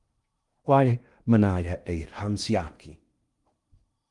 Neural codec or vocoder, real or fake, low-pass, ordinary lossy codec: codec, 16 kHz in and 24 kHz out, 0.8 kbps, FocalCodec, streaming, 65536 codes; fake; 10.8 kHz; Opus, 24 kbps